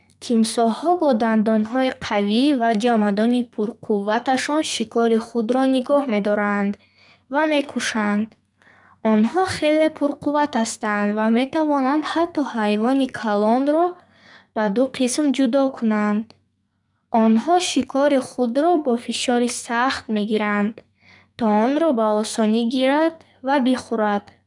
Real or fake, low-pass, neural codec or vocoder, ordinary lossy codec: fake; 10.8 kHz; codec, 44.1 kHz, 2.6 kbps, SNAC; none